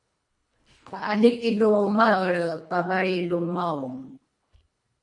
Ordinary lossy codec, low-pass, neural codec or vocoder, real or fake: MP3, 48 kbps; 10.8 kHz; codec, 24 kHz, 1.5 kbps, HILCodec; fake